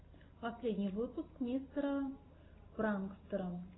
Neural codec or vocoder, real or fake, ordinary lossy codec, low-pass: none; real; AAC, 16 kbps; 7.2 kHz